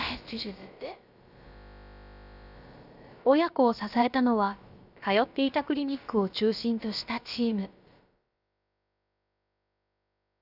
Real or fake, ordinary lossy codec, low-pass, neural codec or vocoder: fake; none; 5.4 kHz; codec, 16 kHz, about 1 kbps, DyCAST, with the encoder's durations